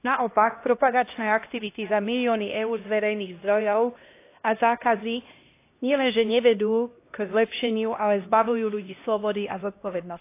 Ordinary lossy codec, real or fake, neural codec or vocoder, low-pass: AAC, 24 kbps; fake; codec, 16 kHz, 1 kbps, X-Codec, HuBERT features, trained on LibriSpeech; 3.6 kHz